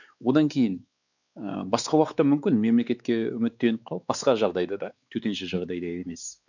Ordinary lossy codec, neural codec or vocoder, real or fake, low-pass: none; codec, 16 kHz, 4 kbps, X-Codec, WavLM features, trained on Multilingual LibriSpeech; fake; 7.2 kHz